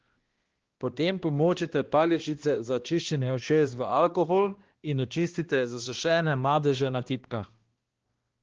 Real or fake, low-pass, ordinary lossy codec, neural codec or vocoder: fake; 7.2 kHz; Opus, 16 kbps; codec, 16 kHz, 1 kbps, X-Codec, HuBERT features, trained on balanced general audio